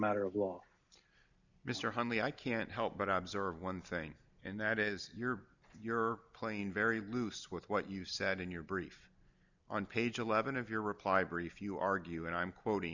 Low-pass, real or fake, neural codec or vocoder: 7.2 kHz; real; none